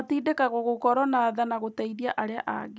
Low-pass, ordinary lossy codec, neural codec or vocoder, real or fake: none; none; none; real